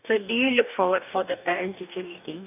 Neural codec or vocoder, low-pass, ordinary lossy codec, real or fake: codec, 44.1 kHz, 2.6 kbps, DAC; 3.6 kHz; none; fake